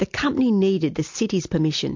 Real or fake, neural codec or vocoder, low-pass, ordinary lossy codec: real; none; 7.2 kHz; MP3, 48 kbps